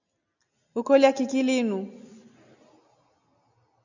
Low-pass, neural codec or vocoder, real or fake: 7.2 kHz; none; real